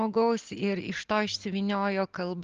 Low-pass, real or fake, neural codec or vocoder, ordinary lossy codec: 7.2 kHz; real; none; Opus, 24 kbps